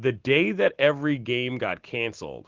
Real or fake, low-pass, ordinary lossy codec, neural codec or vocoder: real; 7.2 kHz; Opus, 16 kbps; none